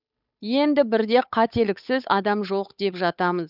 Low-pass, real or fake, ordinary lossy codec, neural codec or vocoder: 5.4 kHz; fake; none; codec, 16 kHz, 8 kbps, FunCodec, trained on Chinese and English, 25 frames a second